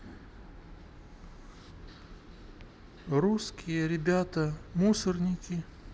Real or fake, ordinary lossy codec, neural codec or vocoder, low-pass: real; none; none; none